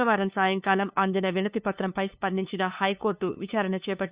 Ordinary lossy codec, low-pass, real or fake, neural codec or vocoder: Opus, 64 kbps; 3.6 kHz; fake; codec, 16 kHz, 2 kbps, FunCodec, trained on LibriTTS, 25 frames a second